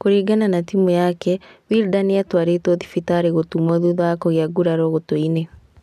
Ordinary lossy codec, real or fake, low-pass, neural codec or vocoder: none; real; 14.4 kHz; none